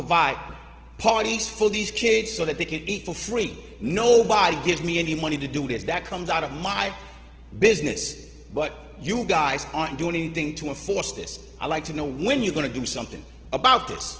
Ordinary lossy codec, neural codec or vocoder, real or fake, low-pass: Opus, 16 kbps; none; real; 7.2 kHz